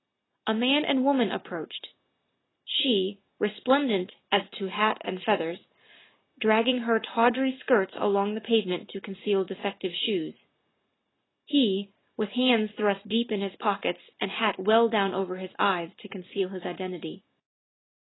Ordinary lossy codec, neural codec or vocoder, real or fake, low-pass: AAC, 16 kbps; none; real; 7.2 kHz